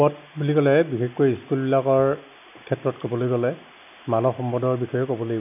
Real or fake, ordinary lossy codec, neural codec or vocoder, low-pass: real; none; none; 3.6 kHz